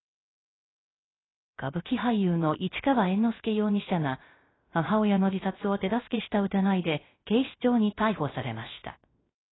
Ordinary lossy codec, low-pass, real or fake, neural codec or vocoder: AAC, 16 kbps; 7.2 kHz; fake; codec, 16 kHz, 0.3 kbps, FocalCodec